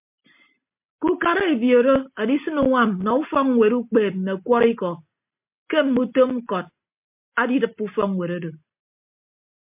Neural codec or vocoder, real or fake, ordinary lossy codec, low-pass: none; real; MP3, 32 kbps; 3.6 kHz